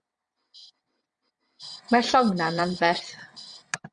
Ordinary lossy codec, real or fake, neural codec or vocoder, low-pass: MP3, 64 kbps; fake; vocoder, 22.05 kHz, 80 mel bands, WaveNeXt; 9.9 kHz